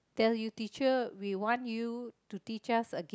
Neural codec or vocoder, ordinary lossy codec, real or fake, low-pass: none; none; real; none